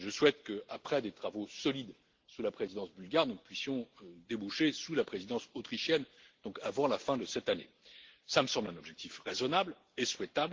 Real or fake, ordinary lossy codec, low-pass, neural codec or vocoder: real; Opus, 16 kbps; 7.2 kHz; none